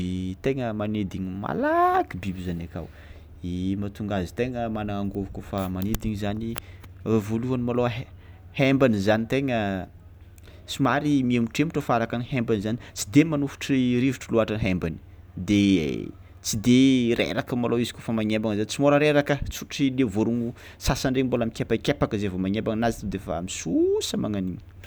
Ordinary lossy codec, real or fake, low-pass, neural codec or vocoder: none; real; none; none